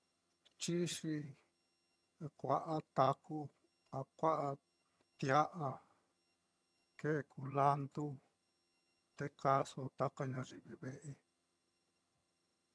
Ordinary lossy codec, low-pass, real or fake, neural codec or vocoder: none; none; fake; vocoder, 22.05 kHz, 80 mel bands, HiFi-GAN